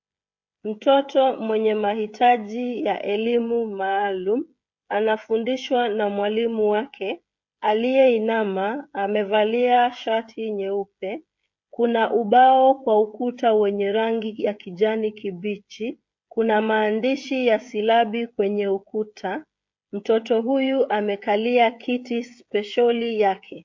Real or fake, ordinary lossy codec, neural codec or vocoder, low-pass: fake; MP3, 48 kbps; codec, 16 kHz, 16 kbps, FreqCodec, smaller model; 7.2 kHz